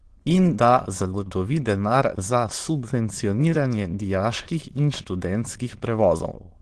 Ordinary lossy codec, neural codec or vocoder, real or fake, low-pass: Opus, 16 kbps; autoencoder, 22.05 kHz, a latent of 192 numbers a frame, VITS, trained on many speakers; fake; 9.9 kHz